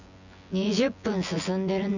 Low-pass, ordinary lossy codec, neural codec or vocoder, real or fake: 7.2 kHz; none; vocoder, 24 kHz, 100 mel bands, Vocos; fake